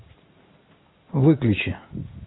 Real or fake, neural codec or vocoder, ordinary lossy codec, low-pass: real; none; AAC, 16 kbps; 7.2 kHz